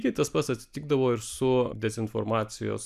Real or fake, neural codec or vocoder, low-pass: real; none; 14.4 kHz